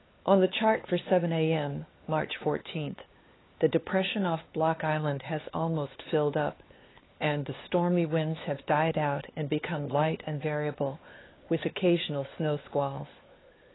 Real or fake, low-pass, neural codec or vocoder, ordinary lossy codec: fake; 7.2 kHz; codec, 16 kHz, 4 kbps, X-Codec, HuBERT features, trained on LibriSpeech; AAC, 16 kbps